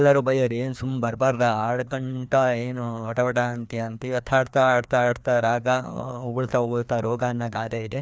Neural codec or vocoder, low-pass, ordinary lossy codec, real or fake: codec, 16 kHz, 2 kbps, FreqCodec, larger model; none; none; fake